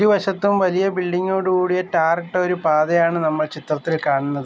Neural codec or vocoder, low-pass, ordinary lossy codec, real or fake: none; none; none; real